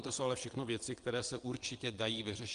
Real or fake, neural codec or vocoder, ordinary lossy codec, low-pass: fake; vocoder, 22.05 kHz, 80 mel bands, WaveNeXt; Opus, 32 kbps; 9.9 kHz